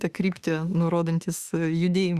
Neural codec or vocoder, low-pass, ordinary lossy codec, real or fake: autoencoder, 48 kHz, 32 numbers a frame, DAC-VAE, trained on Japanese speech; 14.4 kHz; Opus, 64 kbps; fake